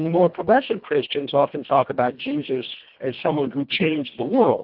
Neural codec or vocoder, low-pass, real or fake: codec, 24 kHz, 1.5 kbps, HILCodec; 5.4 kHz; fake